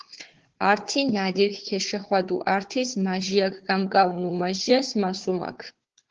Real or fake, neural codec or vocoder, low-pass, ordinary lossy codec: fake; codec, 16 kHz, 4 kbps, FunCodec, trained on Chinese and English, 50 frames a second; 7.2 kHz; Opus, 16 kbps